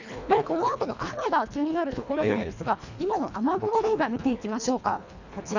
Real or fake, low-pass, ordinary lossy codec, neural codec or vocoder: fake; 7.2 kHz; none; codec, 24 kHz, 1.5 kbps, HILCodec